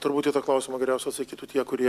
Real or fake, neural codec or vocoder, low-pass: real; none; 14.4 kHz